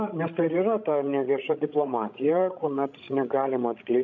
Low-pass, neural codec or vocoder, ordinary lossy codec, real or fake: 7.2 kHz; codec, 16 kHz, 16 kbps, FreqCodec, larger model; MP3, 64 kbps; fake